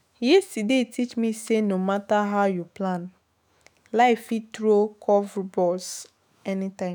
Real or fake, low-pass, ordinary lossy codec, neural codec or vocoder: fake; none; none; autoencoder, 48 kHz, 128 numbers a frame, DAC-VAE, trained on Japanese speech